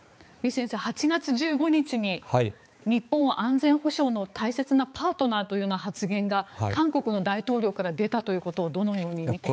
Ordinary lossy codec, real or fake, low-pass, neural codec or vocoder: none; fake; none; codec, 16 kHz, 4 kbps, X-Codec, HuBERT features, trained on balanced general audio